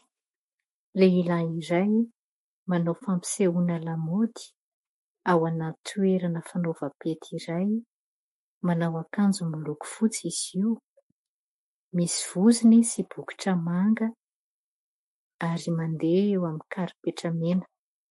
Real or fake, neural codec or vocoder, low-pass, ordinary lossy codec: fake; autoencoder, 48 kHz, 128 numbers a frame, DAC-VAE, trained on Japanese speech; 19.8 kHz; MP3, 48 kbps